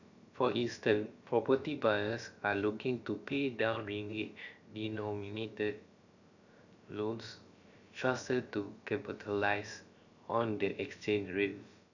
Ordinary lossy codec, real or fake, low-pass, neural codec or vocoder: none; fake; 7.2 kHz; codec, 16 kHz, about 1 kbps, DyCAST, with the encoder's durations